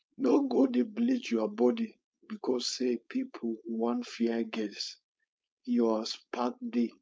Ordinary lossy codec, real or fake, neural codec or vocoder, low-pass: none; fake; codec, 16 kHz, 4.8 kbps, FACodec; none